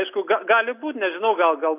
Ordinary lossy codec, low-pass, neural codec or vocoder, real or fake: AAC, 24 kbps; 3.6 kHz; none; real